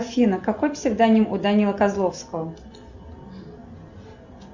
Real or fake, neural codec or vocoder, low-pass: real; none; 7.2 kHz